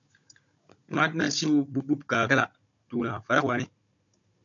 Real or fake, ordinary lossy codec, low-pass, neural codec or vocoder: fake; MP3, 96 kbps; 7.2 kHz; codec, 16 kHz, 16 kbps, FunCodec, trained on Chinese and English, 50 frames a second